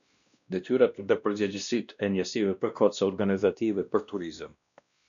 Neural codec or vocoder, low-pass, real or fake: codec, 16 kHz, 1 kbps, X-Codec, WavLM features, trained on Multilingual LibriSpeech; 7.2 kHz; fake